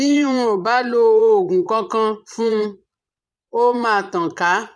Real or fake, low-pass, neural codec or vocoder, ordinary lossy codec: fake; none; vocoder, 22.05 kHz, 80 mel bands, Vocos; none